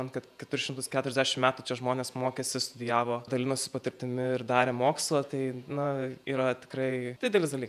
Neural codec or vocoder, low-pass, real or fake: vocoder, 48 kHz, 128 mel bands, Vocos; 14.4 kHz; fake